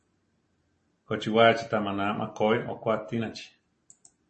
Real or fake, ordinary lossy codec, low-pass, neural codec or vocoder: real; MP3, 32 kbps; 10.8 kHz; none